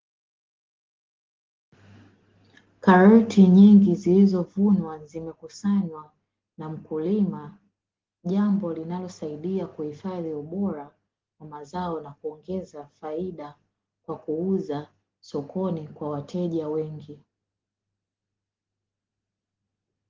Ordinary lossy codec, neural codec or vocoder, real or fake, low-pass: Opus, 16 kbps; none; real; 7.2 kHz